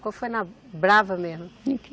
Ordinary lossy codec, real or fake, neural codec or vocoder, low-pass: none; real; none; none